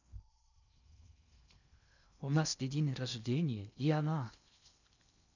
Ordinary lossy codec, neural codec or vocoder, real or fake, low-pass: none; codec, 16 kHz in and 24 kHz out, 0.6 kbps, FocalCodec, streaming, 4096 codes; fake; 7.2 kHz